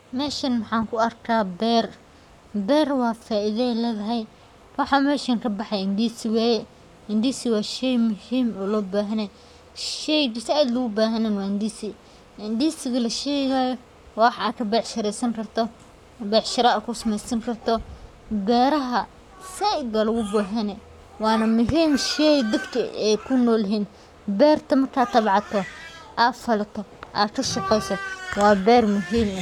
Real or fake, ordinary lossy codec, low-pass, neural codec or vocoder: fake; none; 19.8 kHz; codec, 44.1 kHz, 7.8 kbps, Pupu-Codec